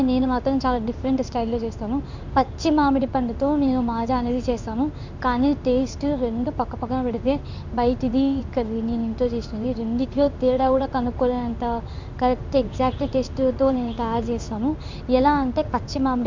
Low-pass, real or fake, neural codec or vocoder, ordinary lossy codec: 7.2 kHz; fake; codec, 16 kHz in and 24 kHz out, 1 kbps, XY-Tokenizer; Opus, 64 kbps